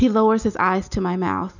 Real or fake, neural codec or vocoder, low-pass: real; none; 7.2 kHz